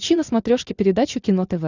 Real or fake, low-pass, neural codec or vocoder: real; 7.2 kHz; none